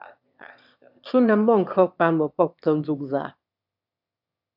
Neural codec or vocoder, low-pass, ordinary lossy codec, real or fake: autoencoder, 22.05 kHz, a latent of 192 numbers a frame, VITS, trained on one speaker; 5.4 kHz; AAC, 48 kbps; fake